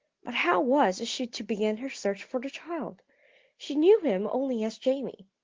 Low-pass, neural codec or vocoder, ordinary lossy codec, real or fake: 7.2 kHz; none; Opus, 16 kbps; real